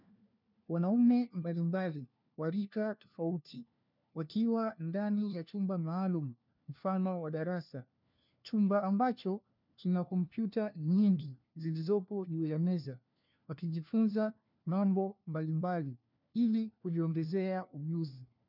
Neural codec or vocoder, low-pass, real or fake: codec, 16 kHz, 1 kbps, FunCodec, trained on LibriTTS, 50 frames a second; 5.4 kHz; fake